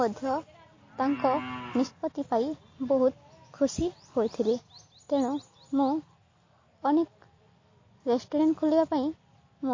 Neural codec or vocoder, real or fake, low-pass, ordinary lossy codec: none; real; 7.2 kHz; MP3, 32 kbps